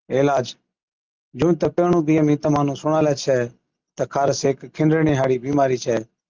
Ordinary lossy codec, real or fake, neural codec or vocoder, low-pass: Opus, 24 kbps; real; none; 7.2 kHz